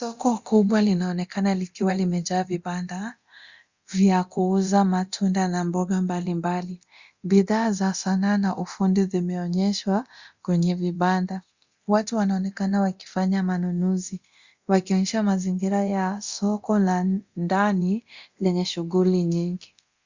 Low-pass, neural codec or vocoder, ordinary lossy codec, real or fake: 7.2 kHz; codec, 24 kHz, 0.9 kbps, DualCodec; Opus, 64 kbps; fake